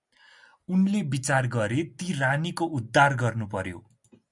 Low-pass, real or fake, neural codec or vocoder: 10.8 kHz; real; none